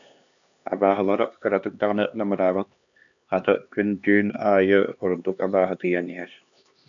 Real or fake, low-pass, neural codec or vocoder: fake; 7.2 kHz; codec, 16 kHz, 2 kbps, X-Codec, HuBERT features, trained on LibriSpeech